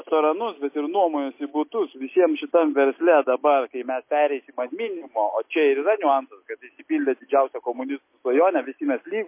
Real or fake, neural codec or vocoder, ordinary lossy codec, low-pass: real; none; MP3, 24 kbps; 3.6 kHz